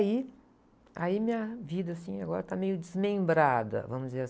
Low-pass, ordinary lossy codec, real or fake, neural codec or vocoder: none; none; real; none